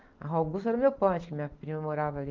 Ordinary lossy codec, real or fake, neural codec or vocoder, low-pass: Opus, 24 kbps; real; none; 7.2 kHz